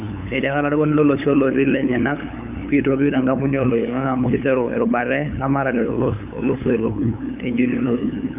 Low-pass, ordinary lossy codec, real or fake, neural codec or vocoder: 3.6 kHz; none; fake; codec, 16 kHz, 8 kbps, FunCodec, trained on LibriTTS, 25 frames a second